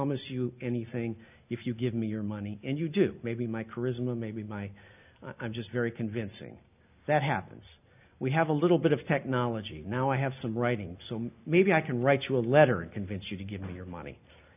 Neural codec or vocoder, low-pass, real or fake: none; 3.6 kHz; real